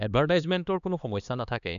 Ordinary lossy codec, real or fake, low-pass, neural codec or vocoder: none; fake; 7.2 kHz; codec, 16 kHz, 2 kbps, X-Codec, HuBERT features, trained on LibriSpeech